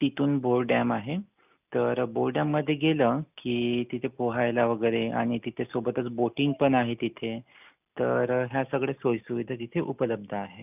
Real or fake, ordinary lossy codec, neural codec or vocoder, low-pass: real; none; none; 3.6 kHz